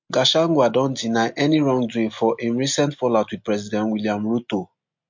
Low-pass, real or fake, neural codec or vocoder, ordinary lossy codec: 7.2 kHz; real; none; MP3, 48 kbps